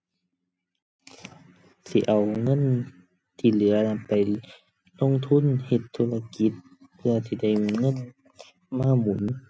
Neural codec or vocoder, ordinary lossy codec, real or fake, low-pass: none; none; real; none